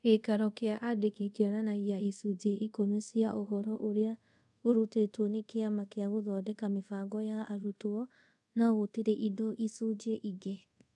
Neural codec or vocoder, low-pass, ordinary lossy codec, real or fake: codec, 24 kHz, 0.5 kbps, DualCodec; 10.8 kHz; none; fake